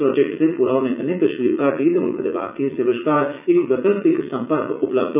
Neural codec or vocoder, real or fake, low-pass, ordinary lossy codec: vocoder, 44.1 kHz, 80 mel bands, Vocos; fake; 3.6 kHz; none